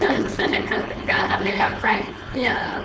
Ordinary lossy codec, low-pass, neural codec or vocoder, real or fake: none; none; codec, 16 kHz, 4.8 kbps, FACodec; fake